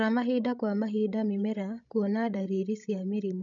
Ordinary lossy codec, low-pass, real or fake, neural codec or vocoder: none; 7.2 kHz; fake; codec, 16 kHz, 16 kbps, FreqCodec, larger model